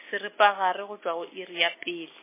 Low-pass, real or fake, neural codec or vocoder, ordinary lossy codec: 3.6 kHz; real; none; MP3, 16 kbps